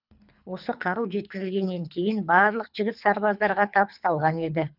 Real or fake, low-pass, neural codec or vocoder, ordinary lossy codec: fake; 5.4 kHz; codec, 24 kHz, 3 kbps, HILCodec; none